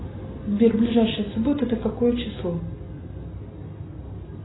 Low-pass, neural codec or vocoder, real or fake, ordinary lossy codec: 7.2 kHz; none; real; AAC, 16 kbps